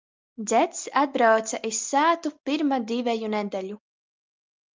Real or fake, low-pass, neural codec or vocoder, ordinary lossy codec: real; 7.2 kHz; none; Opus, 24 kbps